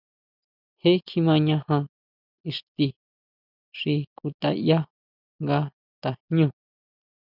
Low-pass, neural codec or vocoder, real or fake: 5.4 kHz; none; real